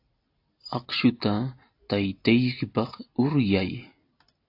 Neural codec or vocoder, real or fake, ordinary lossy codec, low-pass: none; real; AAC, 32 kbps; 5.4 kHz